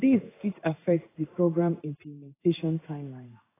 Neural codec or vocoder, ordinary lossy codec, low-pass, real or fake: codec, 16 kHz in and 24 kHz out, 1 kbps, XY-Tokenizer; AAC, 16 kbps; 3.6 kHz; fake